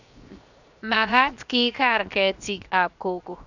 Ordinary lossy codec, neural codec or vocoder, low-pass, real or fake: none; codec, 16 kHz, 0.7 kbps, FocalCodec; 7.2 kHz; fake